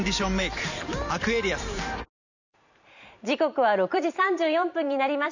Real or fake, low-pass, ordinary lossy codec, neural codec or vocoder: fake; 7.2 kHz; none; vocoder, 44.1 kHz, 128 mel bands every 512 samples, BigVGAN v2